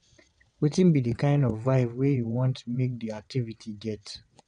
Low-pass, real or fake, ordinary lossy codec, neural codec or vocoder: 9.9 kHz; fake; none; vocoder, 22.05 kHz, 80 mel bands, WaveNeXt